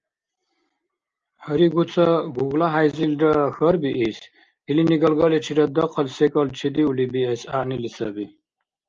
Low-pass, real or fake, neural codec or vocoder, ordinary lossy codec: 7.2 kHz; real; none; Opus, 24 kbps